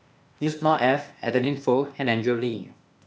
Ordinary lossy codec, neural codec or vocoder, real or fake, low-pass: none; codec, 16 kHz, 0.8 kbps, ZipCodec; fake; none